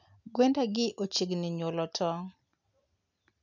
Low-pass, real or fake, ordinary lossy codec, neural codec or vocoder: 7.2 kHz; real; none; none